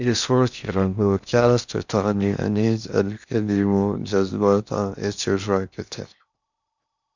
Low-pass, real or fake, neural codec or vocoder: 7.2 kHz; fake; codec, 16 kHz in and 24 kHz out, 0.6 kbps, FocalCodec, streaming, 4096 codes